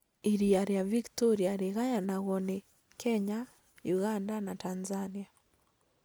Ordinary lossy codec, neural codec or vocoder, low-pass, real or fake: none; none; none; real